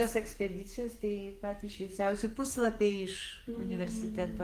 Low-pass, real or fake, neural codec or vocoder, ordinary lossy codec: 14.4 kHz; fake; codec, 44.1 kHz, 2.6 kbps, SNAC; Opus, 24 kbps